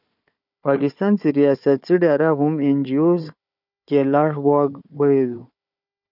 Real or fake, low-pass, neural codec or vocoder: fake; 5.4 kHz; codec, 16 kHz, 4 kbps, FunCodec, trained on Chinese and English, 50 frames a second